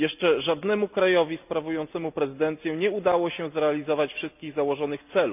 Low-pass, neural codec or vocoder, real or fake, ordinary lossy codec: 3.6 kHz; none; real; none